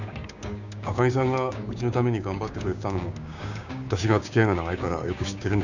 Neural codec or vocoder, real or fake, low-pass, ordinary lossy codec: codec, 16 kHz, 6 kbps, DAC; fake; 7.2 kHz; none